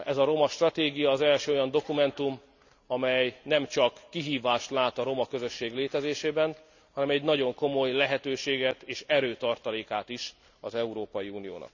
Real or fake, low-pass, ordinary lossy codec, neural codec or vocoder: real; 7.2 kHz; none; none